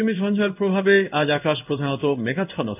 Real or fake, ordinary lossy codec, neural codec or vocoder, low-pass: fake; none; codec, 16 kHz in and 24 kHz out, 1 kbps, XY-Tokenizer; 3.6 kHz